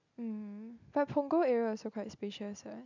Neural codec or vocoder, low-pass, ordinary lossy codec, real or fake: none; 7.2 kHz; none; real